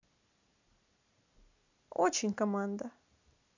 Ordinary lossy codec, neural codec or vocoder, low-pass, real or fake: none; none; 7.2 kHz; real